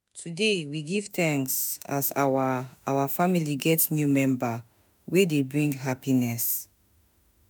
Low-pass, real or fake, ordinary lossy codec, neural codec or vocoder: none; fake; none; autoencoder, 48 kHz, 32 numbers a frame, DAC-VAE, trained on Japanese speech